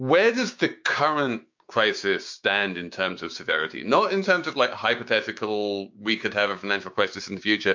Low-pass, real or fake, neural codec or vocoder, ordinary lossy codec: 7.2 kHz; fake; codec, 16 kHz, 6 kbps, DAC; MP3, 48 kbps